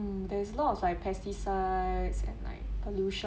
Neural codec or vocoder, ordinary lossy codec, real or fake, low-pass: none; none; real; none